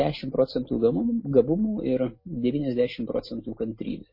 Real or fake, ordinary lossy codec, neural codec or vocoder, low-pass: real; MP3, 24 kbps; none; 5.4 kHz